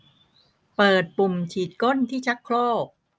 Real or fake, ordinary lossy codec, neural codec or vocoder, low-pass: real; none; none; none